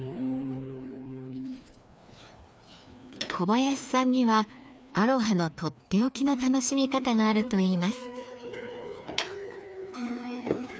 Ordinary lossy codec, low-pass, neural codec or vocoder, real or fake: none; none; codec, 16 kHz, 2 kbps, FreqCodec, larger model; fake